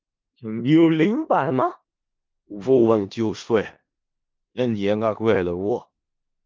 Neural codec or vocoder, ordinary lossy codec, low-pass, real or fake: codec, 16 kHz in and 24 kHz out, 0.4 kbps, LongCat-Audio-Codec, four codebook decoder; Opus, 32 kbps; 7.2 kHz; fake